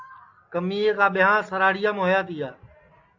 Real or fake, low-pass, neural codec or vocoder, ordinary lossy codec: real; 7.2 kHz; none; AAC, 48 kbps